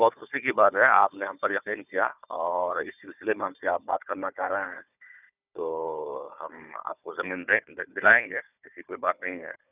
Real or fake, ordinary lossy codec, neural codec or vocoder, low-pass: fake; none; codec, 16 kHz, 4 kbps, FunCodec, trained on Chinese and English, 50 frames a second; 3.6 kHz